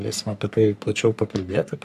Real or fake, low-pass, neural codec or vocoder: fake; 14.4 kHz; codec, 44.1 kHz, 3.4 kbps, Pupu-Codec